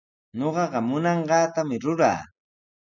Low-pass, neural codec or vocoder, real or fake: 7.2 kHz; none; real